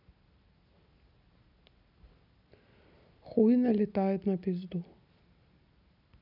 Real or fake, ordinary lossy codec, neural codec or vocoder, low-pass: real; none; none; 5.4 kHz